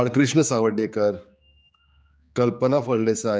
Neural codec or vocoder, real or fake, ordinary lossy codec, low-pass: codec, 16 kHz, 4 kbps, X-Codec, HuBERT features, trained on general audio; fake; none; none